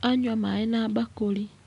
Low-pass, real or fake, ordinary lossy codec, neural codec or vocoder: 10.8 kHz; real; none; none